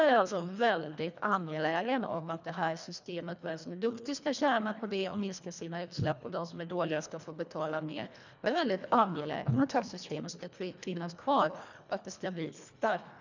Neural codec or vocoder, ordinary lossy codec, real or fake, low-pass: codec, 24 kHz, 1.5 kbps, HILCodec; none; fake; 7.2 kHz